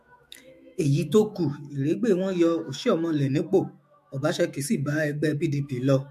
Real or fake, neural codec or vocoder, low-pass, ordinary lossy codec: fake; autoencoder, 48 kHz, 128 numbers a frame, DAC-VAE, trained on Japanese speech; 14.4 kHz; MP3, 64 kbps